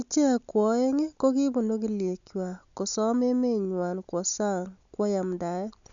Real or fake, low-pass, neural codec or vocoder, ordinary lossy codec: real; 7.2 kHz; none; none